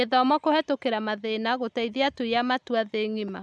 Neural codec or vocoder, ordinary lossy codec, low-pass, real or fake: none; none; none; real